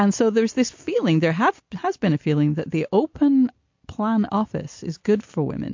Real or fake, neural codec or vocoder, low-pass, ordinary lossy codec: real; none; 7.2 kHz; MP3, 48 kbps